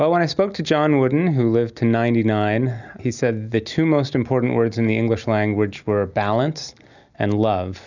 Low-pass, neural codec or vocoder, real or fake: 7.2 kHz; none; real